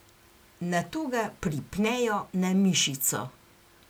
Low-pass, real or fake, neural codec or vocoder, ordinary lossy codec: none; real; none; none